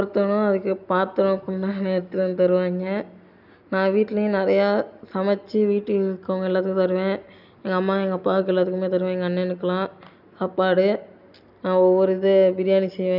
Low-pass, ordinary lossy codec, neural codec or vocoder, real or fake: 5.4 kHz; none; none; real